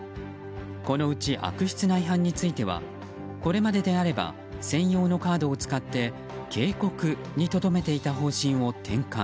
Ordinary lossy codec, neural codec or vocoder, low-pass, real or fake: none; none; none; real